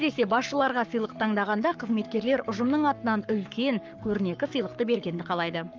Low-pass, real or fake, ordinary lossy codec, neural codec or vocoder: 7.2 kHz; fake; Opus, 24 kbps; codec, 44.1 kHz, 7.8 kbps, Pupu-Codec